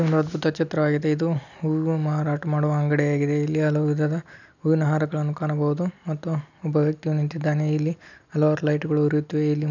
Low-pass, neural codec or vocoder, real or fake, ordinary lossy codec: 7.2 kHz; none; real; none